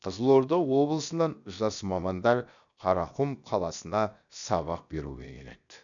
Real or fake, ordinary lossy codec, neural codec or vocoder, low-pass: fake; none; codec, 16 kHz, 0.7 kbps, FocalCodec; 7.2 kHz